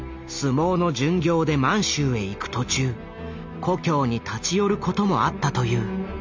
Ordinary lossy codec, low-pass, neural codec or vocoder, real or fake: MP3, 64 kbps; 7.2 kHz; none; real